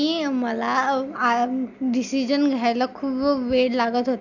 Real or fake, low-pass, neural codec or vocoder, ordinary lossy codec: real; 7.2 kHz; none; none